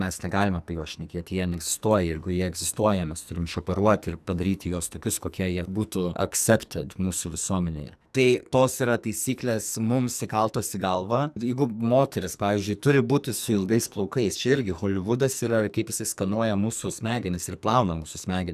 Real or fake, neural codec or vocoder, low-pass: fake; codec, 44.1 kHz, 2.6 kbps, SNAC; 14.4 kHz